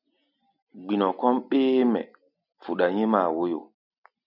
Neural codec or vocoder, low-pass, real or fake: none; 5.4 kHz; real